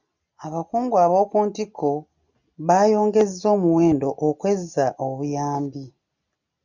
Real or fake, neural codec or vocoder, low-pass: real; none; 7.2 kHz